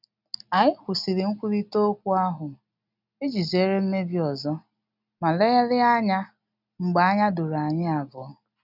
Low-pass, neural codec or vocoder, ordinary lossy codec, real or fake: 5.4 kHz; none; none; real